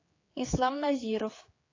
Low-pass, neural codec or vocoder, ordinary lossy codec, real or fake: 7.2 kHz; codec, 16 kHz, 4 kbps, X-Codec, HuBERT features, trained on general audio; AAC, 32 kbps; fake